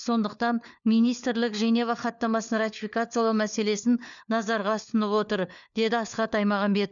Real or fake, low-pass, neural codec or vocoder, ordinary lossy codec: fake; 7.2 kHz; codec, 16 kHz, 4 kbps, FunCodec, trained on LibriTTS, 50 frames a second; none